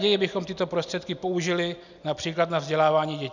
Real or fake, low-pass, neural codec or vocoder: real; 7.2 kHz; none